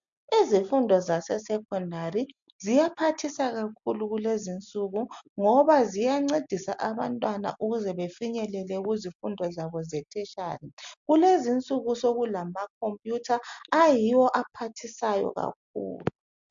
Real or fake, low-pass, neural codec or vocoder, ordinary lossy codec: real; 7.2 kHz; none; MP3, 64 kbps